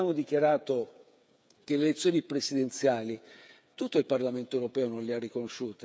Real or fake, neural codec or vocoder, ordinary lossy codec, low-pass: fake; codec, 16 kHz, 8 kbps, FreqCodec, smaller model; none; none